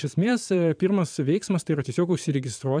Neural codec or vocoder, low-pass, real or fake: vocoder, 22.05 kHz, 80 mel bands, Vocos; 9.9 kHz; fake